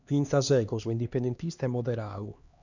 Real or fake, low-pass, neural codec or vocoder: fake; 7.2 kHz; codec, 16 kHz, 2 kbps, X-Codec, HuBERT features, trained on LibriSpeech